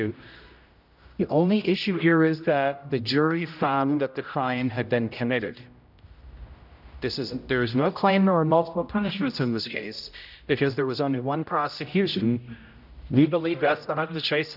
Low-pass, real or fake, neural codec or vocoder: 5.4 kHz; fake; codec, 16 kHz, 0.5 kbps, X-Codec, HuBERT features, trained on general audio